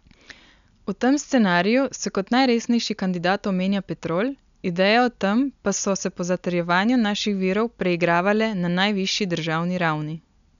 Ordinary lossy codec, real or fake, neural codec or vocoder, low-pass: none; real; none; 7.2 kHz